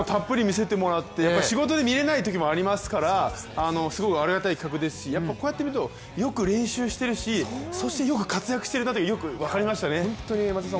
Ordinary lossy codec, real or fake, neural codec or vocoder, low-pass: none; real; none; none